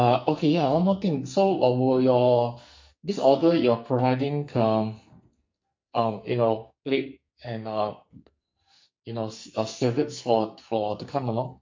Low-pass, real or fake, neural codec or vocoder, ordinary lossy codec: 7.2 kHz; fake; codec, 44.1 kHz, 2.6 kbps, SNAC; MP3, 48 kbps